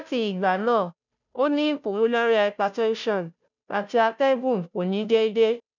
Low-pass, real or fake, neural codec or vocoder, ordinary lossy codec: 7.2 kHz; fake; codec, 16 kHz, 0.5 kbps, FunCodec, trained on Chinese and English, 25 frames a second; none